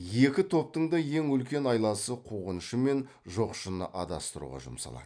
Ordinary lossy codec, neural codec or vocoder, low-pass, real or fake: none; none; 9.9 kHz; real